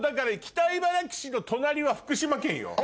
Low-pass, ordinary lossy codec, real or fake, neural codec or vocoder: none; none; real; none